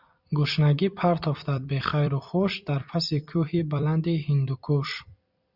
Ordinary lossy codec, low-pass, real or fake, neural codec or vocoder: Opus, 64 kbps; 5.4 kHz; fake; vocoder, 44.1 kHz, 128 mel bands every 256 samples, BigVGAN v2